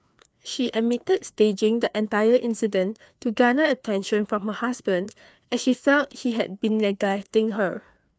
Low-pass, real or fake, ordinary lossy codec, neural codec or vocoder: none; fake; none; codec, 16 kHz, 2 kbps, FreqCodec, larger model